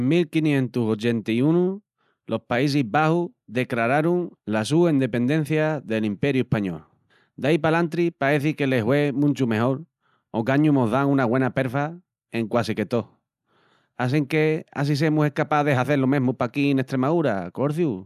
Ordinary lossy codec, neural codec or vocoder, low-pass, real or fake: none; none; 14.4 kHz; real